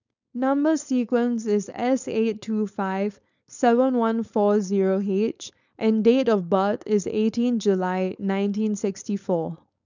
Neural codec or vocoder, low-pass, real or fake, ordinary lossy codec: codec, 16 kHz, 4.8 kbps, FACodec; 7.2 kHz; fake; none